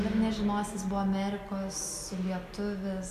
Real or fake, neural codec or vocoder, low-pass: real; none; 14.4 kHz